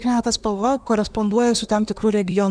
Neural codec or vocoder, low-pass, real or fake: codec, 24 kHz, 1 kbps, SNAC; 9.9 kHz; fake